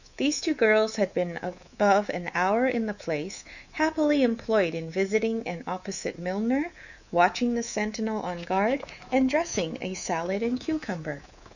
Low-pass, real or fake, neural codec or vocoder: 7.2 kHz; fake; codec, 24 kHz, 3.1 kbps, DualCodec